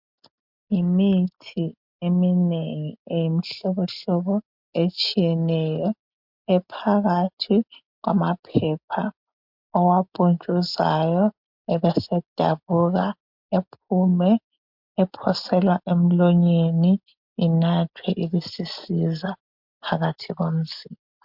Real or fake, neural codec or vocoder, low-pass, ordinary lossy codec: real; none; 5.4 kHz; MP3, 48 kbps